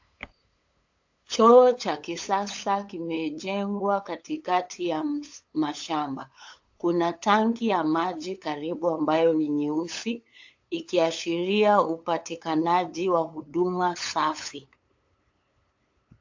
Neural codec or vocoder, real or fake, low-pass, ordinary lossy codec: codec, 16 kHz, 8 kbps, FunCodec, trained on LibriTTS, 25 frames a second; fake; 7.2 kHz; AAC, 48 kbps